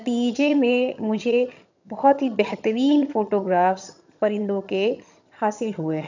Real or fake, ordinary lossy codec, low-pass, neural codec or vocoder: fake; none; 7.2 kHz; vocoder, 22.05 kHz, 80 mel bands, HiFi-GAN